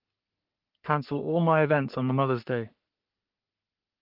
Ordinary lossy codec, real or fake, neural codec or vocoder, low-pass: Opus, 24 kbps; fake; codec, 44.1 kHz, 3.4 kbps, Pupu-Codec; 5.4 kHz